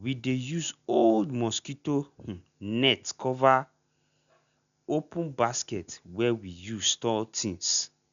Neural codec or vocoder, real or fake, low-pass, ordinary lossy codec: none; real; 7.2 kHz; none